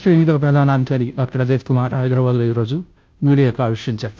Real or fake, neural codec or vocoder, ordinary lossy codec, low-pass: fake; codec, 16 kHz, 0.5 kbps, FunCodec, trained on Chinese and English, 25 frames a second; none; none